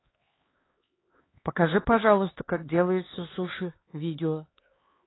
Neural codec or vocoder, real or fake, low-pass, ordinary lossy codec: codec, 16 kHz, 4 kbps, X-Codec, HuBERT features, trained on LibriSpeech; fake; 7.2 kHz; AAC, 16 kbps